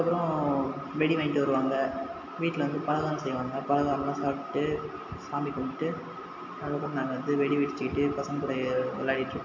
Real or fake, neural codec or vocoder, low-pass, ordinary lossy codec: real; none; 7.2 kHz; AAC, 48 kbps